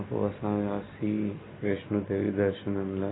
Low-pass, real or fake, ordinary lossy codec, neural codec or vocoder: 7.2 kHz; real; AAC, 16 kbps; none